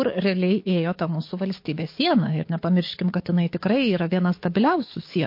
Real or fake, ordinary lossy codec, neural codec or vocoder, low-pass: fake; MP3, 32 kbps; codec, 44.1 kHz, 7.8 kbps, DAC; 5.4 kHz